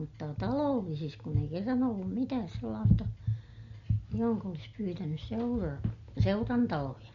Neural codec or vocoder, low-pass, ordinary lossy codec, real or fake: none; 7.2 kHz; MP3, 48 kbps; real